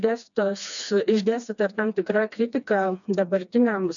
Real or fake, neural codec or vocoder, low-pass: fake; codec, 16 kHz, 2 kbps, FreqCodec, smaller model; 7.2 kHz